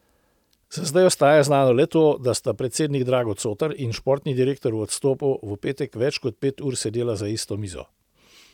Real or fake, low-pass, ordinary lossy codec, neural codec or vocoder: real; 19.8 kHz; none; none